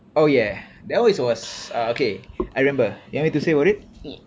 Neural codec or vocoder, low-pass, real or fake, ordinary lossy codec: none; none; real; none